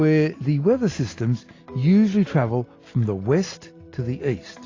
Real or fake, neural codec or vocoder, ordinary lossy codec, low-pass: real; none; AAC, 32 kbps; 7.2 kHz